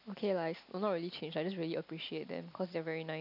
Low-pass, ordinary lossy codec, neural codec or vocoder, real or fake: 5.4 kHz; none; none; real